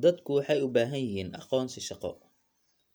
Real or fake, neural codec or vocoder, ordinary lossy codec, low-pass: real; none; none; none